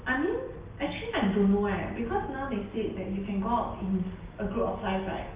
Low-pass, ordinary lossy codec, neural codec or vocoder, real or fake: 3.6 kHz; Opus, 32 kbps; none; real